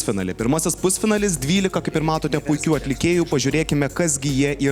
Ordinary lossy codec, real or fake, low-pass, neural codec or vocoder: Opus, 64 kbps; real; 19.8 kHz; none